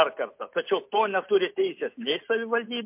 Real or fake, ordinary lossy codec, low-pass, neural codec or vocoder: real; MP3, 32 kbps; 3.6 kHz; none